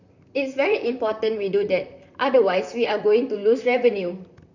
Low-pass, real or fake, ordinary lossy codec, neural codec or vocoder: 7.2 kHz; fake; none; vocoder, 44.1 kHz, 128 mel bands, Pupu-Vocoder